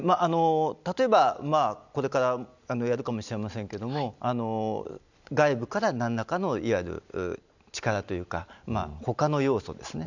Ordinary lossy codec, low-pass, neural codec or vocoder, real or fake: none; 7.2 kHz; none; real